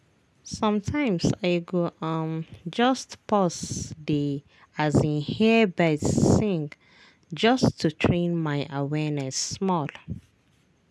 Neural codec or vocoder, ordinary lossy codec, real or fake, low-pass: none; none; real; none